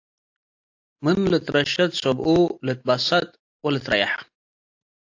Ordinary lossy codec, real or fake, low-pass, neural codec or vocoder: AAC, 48 kbps; real; 7.2 kHz; none